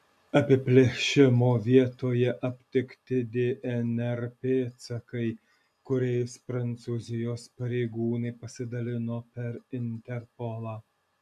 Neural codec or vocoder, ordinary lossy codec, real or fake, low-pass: none; MP3, 96 kbps; real; 14.4 kHz